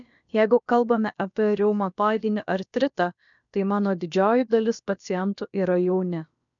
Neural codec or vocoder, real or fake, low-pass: codec, 16 kHz, about 1 kbps, DyCAST, with the encoder's durations; fake; 7.2 kHz